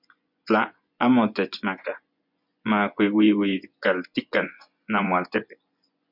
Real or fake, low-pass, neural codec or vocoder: fake; 5.4 kHz; vocoder, 24 kHz, 100 mel bands, Vocos